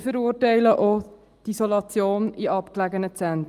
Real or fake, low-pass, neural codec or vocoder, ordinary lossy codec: real; 14.4 kHz; none; Opus, 32 kbps